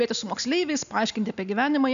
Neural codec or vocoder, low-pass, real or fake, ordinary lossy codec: none; 7.2 kHz; real; AAC, 96 kbps